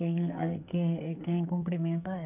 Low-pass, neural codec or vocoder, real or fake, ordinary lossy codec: 3.6 kHz; codec, 44.1 kHz, 2.6 kbps, SNAC; fake; none